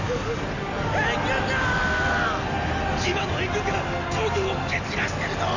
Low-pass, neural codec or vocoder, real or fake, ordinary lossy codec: 7.2 kHz; none; real; none